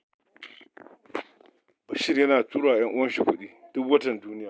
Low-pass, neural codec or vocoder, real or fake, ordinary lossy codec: none; none; real; none